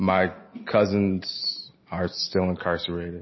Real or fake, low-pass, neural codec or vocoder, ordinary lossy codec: real; 7.2 kHz; none; MP3, 24 kbps